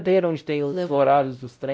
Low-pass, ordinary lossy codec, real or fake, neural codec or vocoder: none; none; fake; codec, 16 kHz, 0.5 kbps, X-Codec, WavLM features, trained on Multilingual LibriSpeech